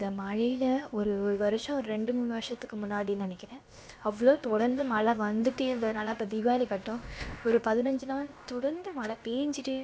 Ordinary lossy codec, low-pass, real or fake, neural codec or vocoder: none; none; fake; codec, 16 kHz, about 1 kbps, DyCAST, with the encoder's durations